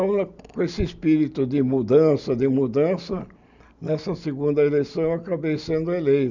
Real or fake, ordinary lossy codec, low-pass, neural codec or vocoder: real; none; 7.2 kHz; none